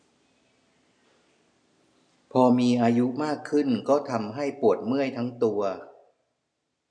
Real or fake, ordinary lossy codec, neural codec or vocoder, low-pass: real; none; none; 9.9 kHz